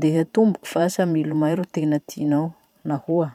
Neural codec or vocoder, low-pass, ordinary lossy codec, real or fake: vocoder, 44.1 kHz, 128 mel bands every 512 samples, BigVGAN v2; 19.8 kHz; none; fake